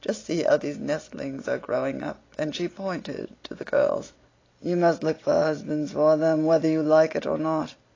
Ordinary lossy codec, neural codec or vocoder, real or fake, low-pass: AAC, 32 kbps; none; real; 7.2 kHz